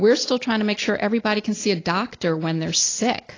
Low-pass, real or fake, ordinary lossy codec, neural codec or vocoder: 7.2 kHz; real; AAC, 32 kbps; none